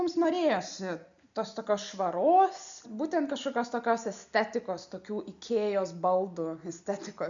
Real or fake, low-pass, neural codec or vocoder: real; 7.2 kHz; none